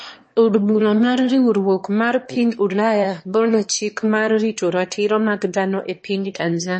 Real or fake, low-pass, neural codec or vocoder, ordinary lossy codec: fake; 9.9 kHz; autoencoder, 22.05 kHz, a latent of 192 numbers a frame, VITS, trained on one speaker; MP3, 32 kbps